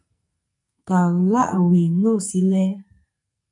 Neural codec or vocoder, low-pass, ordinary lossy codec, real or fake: codec, 44.1 kHz, 2.6 kbps, SNAC; 10.8 kHz; MP3, 96 kbps; fake